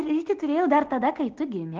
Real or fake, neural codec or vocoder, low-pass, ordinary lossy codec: real; none; 7.2 kHz; Opus, 16 kbps